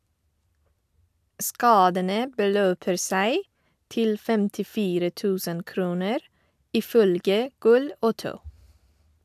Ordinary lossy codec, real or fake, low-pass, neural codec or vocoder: AAC, 96 kbps; real; 14.4 kHz; none